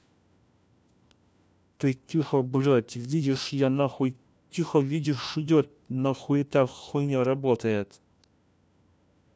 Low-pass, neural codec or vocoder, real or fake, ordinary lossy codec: none; codec, 16 kHz, 1 kbps, FunCodec, trained on LibriTTS, 50 frames a second; fake; none